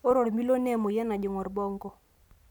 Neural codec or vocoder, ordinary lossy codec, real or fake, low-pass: none; none; real; 19.8 kHz